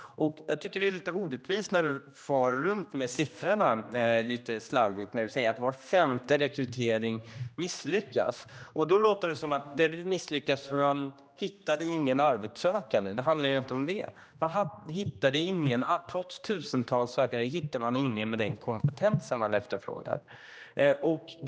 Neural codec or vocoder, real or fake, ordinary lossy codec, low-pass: codec, 16 kHz, 1 kbps, X-Codec, HuBERT features, trained on general audio; fake; none; none